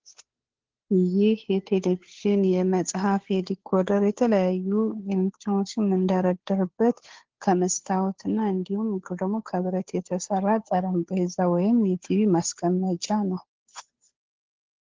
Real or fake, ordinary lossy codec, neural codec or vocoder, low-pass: fake; Opus, 16 kbps; codec, 16 kHz, 2 kbps, FunCodec, trained on Chinese and English, 25 frames a second; 7.2 kHz